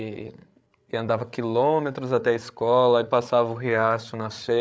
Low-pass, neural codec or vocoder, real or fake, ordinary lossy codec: none; codec, 16 kHz, 8 kbps, FreqCodec, larger model; fake; none